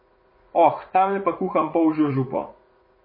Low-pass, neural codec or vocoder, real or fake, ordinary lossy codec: 5.4 kHz; vocoder, 44.1 kHz, 80 mel bands, Vocos; fake; MP3, 24 kbps